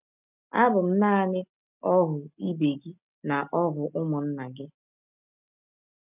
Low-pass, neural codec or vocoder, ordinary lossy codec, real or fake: 3.6 kHz; none; none; real